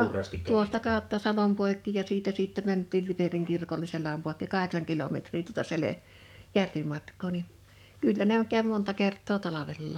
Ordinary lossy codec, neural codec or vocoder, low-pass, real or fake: none; codec, 44.1 kHz, 7.8 kbps, DAC; 19.8 kHz; fake